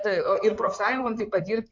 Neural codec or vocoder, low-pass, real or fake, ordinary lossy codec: codec, 16 kHz in and 24 kHz out, 2.2 kbps, FireRedTTS-2 codec; 7.2 kHz; fake; MP3, 48 kbps